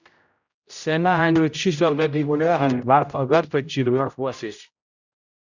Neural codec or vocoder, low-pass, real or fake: codec, 16 kHz, 0.5 kbps, X-Codec, HuBERT features, trained on general audio; 7.2 kHz; fake